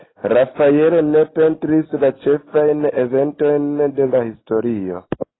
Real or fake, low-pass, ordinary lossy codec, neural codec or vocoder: real; 7.2 kHz; AAC, 16 kbps; none